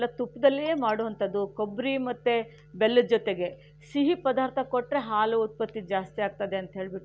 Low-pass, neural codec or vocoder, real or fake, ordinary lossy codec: none; none; real; none